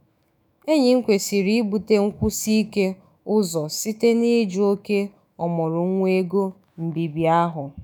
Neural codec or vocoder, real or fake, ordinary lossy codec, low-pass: autoencoder, 48 kHz, 128 numbers a frame, DAC-VAE, trained on Japanese speech; fake; none; none